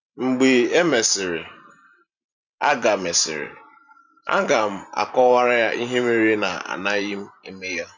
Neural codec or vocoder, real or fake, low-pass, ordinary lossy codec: vocoder, 44.1 kHz, 128 mel bands every 512 samples, BigVGAN v2; fake; 7.2 kHz; none